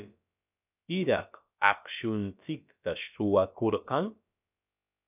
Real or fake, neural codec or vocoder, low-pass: fake; codec, 16 kHz, about 1 kbps, DyCAST, with the encoder's durations; 3.6 kHz